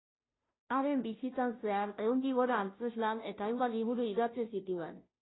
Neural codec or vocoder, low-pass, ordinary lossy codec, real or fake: codec, 16 kHz, 0.5 kbps, FunCodec, trained on Chinese and English, 25 frames a second; 7.2 kHz; AAC, 16 kbps; fake